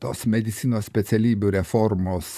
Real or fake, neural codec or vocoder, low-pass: fake; vocoder, 44.1 kHz, 128 mel bands, Pupu-Vocoder; 14.4 kHz